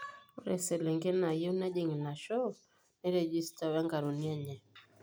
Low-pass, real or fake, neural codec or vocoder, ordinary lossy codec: none; real; none; none